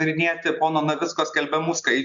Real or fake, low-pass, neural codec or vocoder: real; 7.2 kHz; none